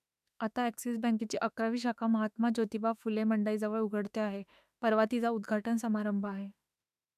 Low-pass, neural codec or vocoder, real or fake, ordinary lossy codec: 14.4 kHz; autoencoder, 48 kHz, 32 numbers a frame, DAC-VAE, trained on Japanese speech; fake; none